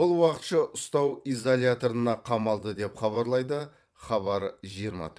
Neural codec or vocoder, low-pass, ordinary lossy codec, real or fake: vocoder, 22.05 kHz, 80 mel bands, WaveNeXt; none; none; fake